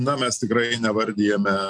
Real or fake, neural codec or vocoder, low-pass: real; none; 9.9 kHz